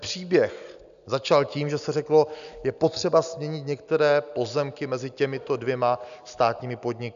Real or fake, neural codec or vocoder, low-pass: real; none; 7.2 kHz